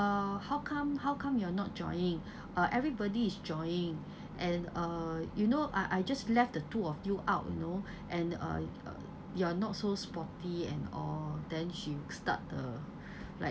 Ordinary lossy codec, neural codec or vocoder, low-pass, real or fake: none; none; none; real